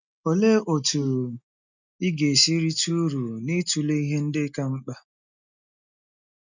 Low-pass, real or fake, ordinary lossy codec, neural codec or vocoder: 7.2 kHz; real; none; none